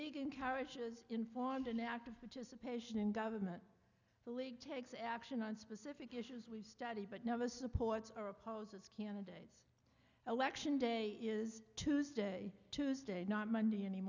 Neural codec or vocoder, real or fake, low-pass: none; real; 7.2 kHz